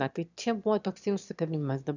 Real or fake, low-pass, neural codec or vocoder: fake; 7.2 kHz; autoencoder, 22.05 kHz, a latent of 192 numbers a frame, VITS, trained on one speaker